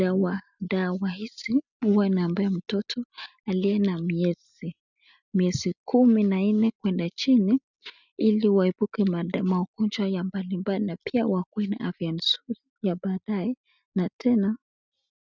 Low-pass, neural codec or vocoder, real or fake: 7.2 kHz; none; real